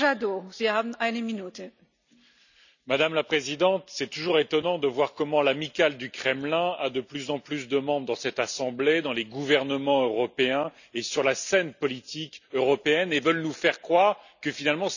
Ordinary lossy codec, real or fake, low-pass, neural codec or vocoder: none; real; 7.2 kHz; none